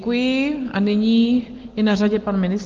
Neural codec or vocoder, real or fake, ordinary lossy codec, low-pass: none; real; Opus, 16 kbps; 7.2 kHz